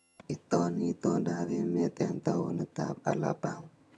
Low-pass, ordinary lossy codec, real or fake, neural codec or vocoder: none; none; fake; vocoder, 22.05 kHz, 80 mel bands, HiFi-GAN